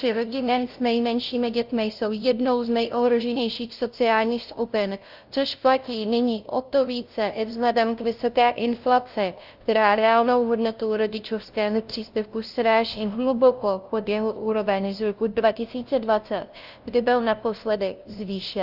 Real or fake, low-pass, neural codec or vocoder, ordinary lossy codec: fake; 5.4 kHz; codec, 16 kHz, 0.5 kbps, FunCodec, trained on LibriTTS, 25 frames a second; Opus, 24 kbps